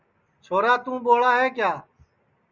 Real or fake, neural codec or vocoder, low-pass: real; none; 7.2 kHz